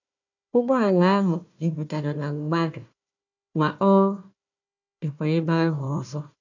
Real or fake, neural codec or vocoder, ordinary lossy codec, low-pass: fake; codec, 16 kHz, 1 kbps, FunCodec, trained on Chinese and English, 50 frames a second; none; 7.2 kHz